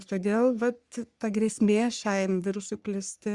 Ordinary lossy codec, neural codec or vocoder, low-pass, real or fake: Opus, 64 kbps; codec, 44.1 kHz, 3.4 kbps, Pupu-Codec; 10.8 kHz; fake